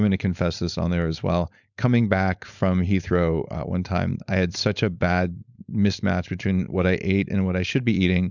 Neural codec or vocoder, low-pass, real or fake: codec, 16 kHz, 4.8 kbps, FACodec; 7.2 kHz; fake